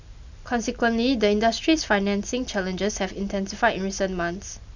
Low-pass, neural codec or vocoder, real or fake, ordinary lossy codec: 7.2 kHz; none; real; none